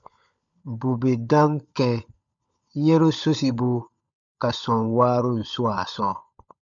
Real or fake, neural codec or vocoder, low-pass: fake; codec, 16 kHz, 16 kbps, FunCodec, trained on LibriTTS, 50 frames a second; 7.2 kHz